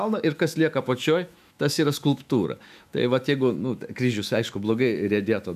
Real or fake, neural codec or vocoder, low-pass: fake; autoencoder, 48 kHz, 128 numbers a frame, DAC-VAE, trained on Japanese speech; 14.4 kHz